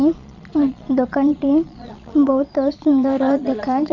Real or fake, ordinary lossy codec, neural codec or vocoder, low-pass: fake; none; vocoder, 22.05 kHz, 80 mel bands, Vocos; 7.2 kHz